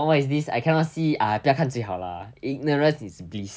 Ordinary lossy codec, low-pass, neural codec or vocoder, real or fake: none; none; none; real